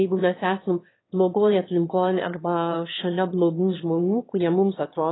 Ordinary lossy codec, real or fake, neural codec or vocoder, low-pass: AAC, 16 kbps; fake; autoencoder, 22.05 kHz, a latent of 192 numbers a frame, VITS, trained on one speaker; 7.2 kHz